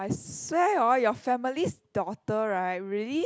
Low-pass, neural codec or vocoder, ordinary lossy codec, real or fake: none; none; none; real